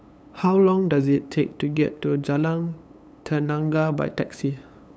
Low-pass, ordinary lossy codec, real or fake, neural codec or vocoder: none; none; fake; codec, 16 kHz, 8 kbps, FunCodec, trained on LibriTTS, 25 frames a second